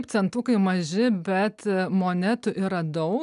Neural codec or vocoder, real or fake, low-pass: none; real; 10.8 kHz